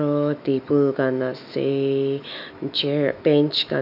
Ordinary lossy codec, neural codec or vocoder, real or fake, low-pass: none; autoencoder, 48 kHz, 128 numbers a frame, DAC-VAE, trained on Japanese speech; fake; 5.4 kHz